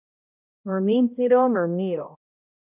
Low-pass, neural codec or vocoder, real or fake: 3.6 kHz; codec, 16 kHz, 0.5 kbps, X-Codec, HuBERT features, trained on balanced general audio; fake